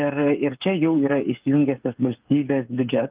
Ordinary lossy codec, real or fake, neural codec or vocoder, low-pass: Opus, 32 kbps; fake; codec, 16 kHz, 8 kbps, FreqCodec, smaller model; 3.6 kHz